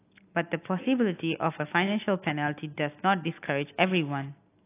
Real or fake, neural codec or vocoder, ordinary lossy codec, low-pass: real; none; AAC, 24 kbps; 3.6 kHz